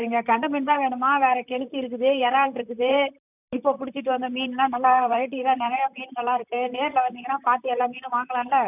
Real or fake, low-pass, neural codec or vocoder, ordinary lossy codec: fake; 3.6 kHz; vocoder, 44.1 kHz, 128 mel bands, Pupu-Vocoder; none